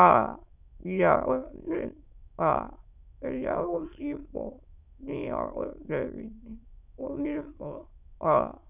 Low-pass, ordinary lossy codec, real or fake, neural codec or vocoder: 3.6 kHz; none; fake; autoencoder, 22.05 kHz, a latent of 192 numbers a frame, VITS, trained on many speakers